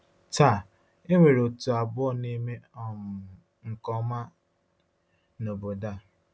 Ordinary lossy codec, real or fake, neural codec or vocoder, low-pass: none; real; none; none